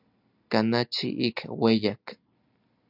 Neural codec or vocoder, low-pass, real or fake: none; 5.4 kHz; real